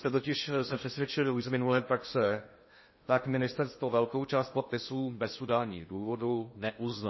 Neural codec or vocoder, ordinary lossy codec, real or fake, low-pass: codec, 16 kHz in and 24 kHz out, 0.6 kbps, FocalCodec, streaming, 2048 codes; MP3, 24 kbps; fake; 7.2 kHz